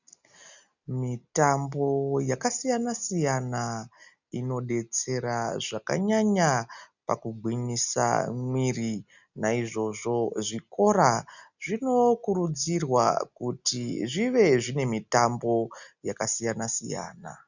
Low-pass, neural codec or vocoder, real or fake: 7.2 kHz; none; real